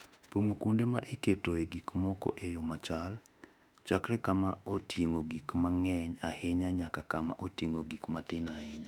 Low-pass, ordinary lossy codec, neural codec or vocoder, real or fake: 19.8 kHz; none; autoencoder, 48 kHz, 32 numbers a frame, DAC-VAE, trained on Japanese speech; fake